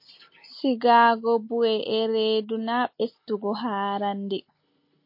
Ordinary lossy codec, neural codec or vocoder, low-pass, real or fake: MP3, 32 kbps; none; 5.4 kHz; real